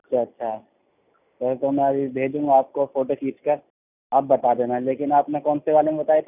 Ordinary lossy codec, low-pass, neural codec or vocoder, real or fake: none; 3.6 kHz; none; real